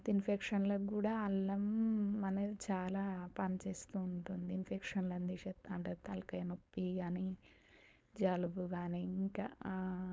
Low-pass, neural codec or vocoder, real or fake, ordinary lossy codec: none; codec, 16 kHz, 4.8 kbps, FACodec; fake; none